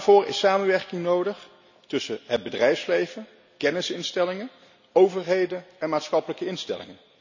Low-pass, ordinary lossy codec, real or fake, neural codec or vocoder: 7.2 kHz; none; real; none